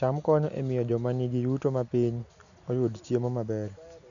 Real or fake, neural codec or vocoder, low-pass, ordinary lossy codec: real; none; 7.2 kHz; none